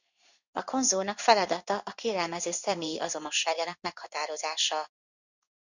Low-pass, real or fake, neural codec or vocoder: 7.2 kHz; fake; codec, 16 kHz in and 24 kHz out, 1 kbps, XY-Tokenizer